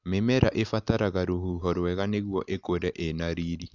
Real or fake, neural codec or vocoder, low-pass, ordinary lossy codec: real; none; 7.2 kHz; none